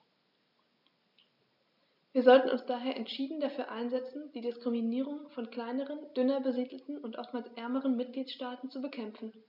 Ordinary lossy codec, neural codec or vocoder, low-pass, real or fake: none; none; 5.4 kHz; real